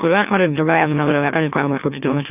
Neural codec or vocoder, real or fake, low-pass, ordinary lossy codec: autoencoder, 44.1 kHz, a latent of 192 numbers a frame, MeloTTS; fake; 3.6 kHz; none